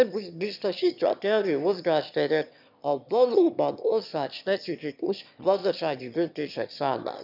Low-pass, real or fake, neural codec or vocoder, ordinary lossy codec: 5.4 kHz; fake; autoencoder, 22.05 kHz, a latent of 192 numbers a frame, VITS, trained on one speaker; none